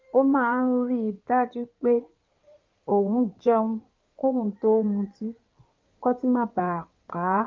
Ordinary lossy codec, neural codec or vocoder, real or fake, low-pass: Opus, 24 kbps; codec, 16 kHz in and 24 kHz out, 2.2 kbps, FireRedTTS-2 codec; fake; 7.2 kHz